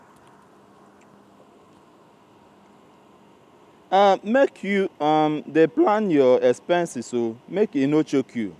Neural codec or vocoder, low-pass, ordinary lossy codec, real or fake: none; 14.4 kHz; none; real